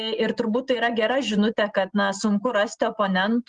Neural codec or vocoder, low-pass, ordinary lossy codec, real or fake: none; 9.9 kHz; Opus, 24 kbps; real